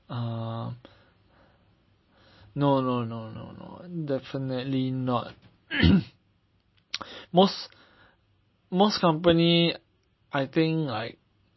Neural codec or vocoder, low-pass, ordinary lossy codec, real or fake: none; 7.2 kHz; MP3, 24 kbps; real